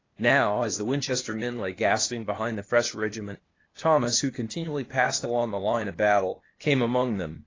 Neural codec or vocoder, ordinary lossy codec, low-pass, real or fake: codec, 16 kHz, 0.8 kbps, ZipCodec; AAC, 32 kbps; 7.2 kHz; fake